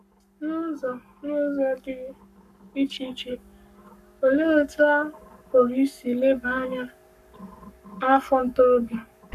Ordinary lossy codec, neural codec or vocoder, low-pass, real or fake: none; codec, 44.1 kHz, 7.8 kbps, Pupu-Codec; 14.4 kHz; fake